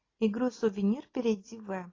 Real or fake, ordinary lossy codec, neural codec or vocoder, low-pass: real; AAC, 32 kbps; none; 7.2 kHz